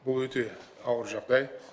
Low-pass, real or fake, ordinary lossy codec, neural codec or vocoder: none; real; none; none